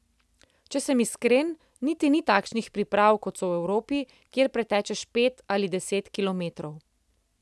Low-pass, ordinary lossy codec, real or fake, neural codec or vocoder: none; none; real; none